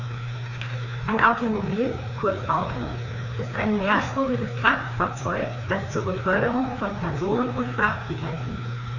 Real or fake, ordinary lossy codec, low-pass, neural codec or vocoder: fake; none; 7.2 kHz; codec, 16 kHz, 2 kbps, FreqCodec, larger model